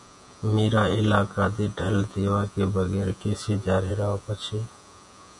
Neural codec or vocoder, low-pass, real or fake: vocoder, 48 kHz, 128 mel bands, Vocos; 10.8 kHz; fake